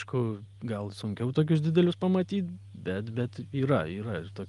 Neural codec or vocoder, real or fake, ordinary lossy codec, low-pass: none; real; Opus, 32 kbps; 10.8 kHz